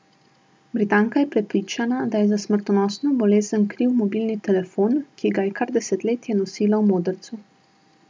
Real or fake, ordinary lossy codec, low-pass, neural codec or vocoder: real; none; none; none